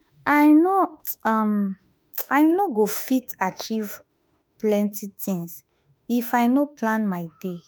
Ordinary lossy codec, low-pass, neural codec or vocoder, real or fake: none; none; autoencoder, 48 kHz, 32 numbers a frame, DAC-VAE, trained on Japanese speech; fake